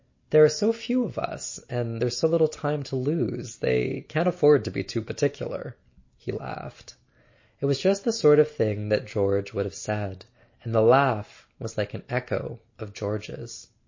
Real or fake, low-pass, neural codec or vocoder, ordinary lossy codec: real; 7.2 kHz; none; MP3, 32 kbps